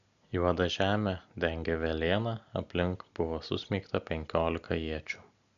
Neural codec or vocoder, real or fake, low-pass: none; real; 7.2 kHz